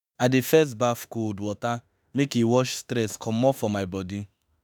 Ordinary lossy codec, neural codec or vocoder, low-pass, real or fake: none; autoencoder, 48 kHz, 32 numbers a frame, DAC-VAE, trained on Japanese speech; none; fake